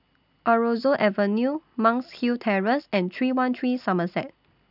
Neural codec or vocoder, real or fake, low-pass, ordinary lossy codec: none; real; 5.4 kHz; none